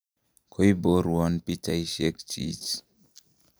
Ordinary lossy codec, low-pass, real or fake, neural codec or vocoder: none; none; real; none